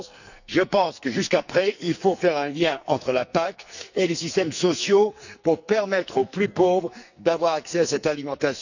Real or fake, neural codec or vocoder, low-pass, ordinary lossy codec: fake; codec, 44.1 kHz, 2.6 kbps, SNAC; 7.2 kHz; none